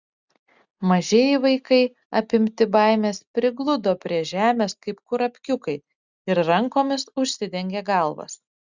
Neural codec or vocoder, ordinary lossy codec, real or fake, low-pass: none; Opus, 64 kbps; real; 7.2 kHz